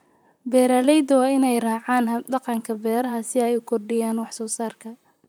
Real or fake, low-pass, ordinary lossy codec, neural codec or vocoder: fake; none; none; vocoder, 44.1 kHz, 128 mel bands, Pupu-Vocoder